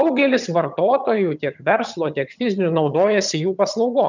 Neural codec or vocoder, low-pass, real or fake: vocoder, 22.05 kHz, 80 mel bands, HiFi-GAN; 7.2 kHz; fake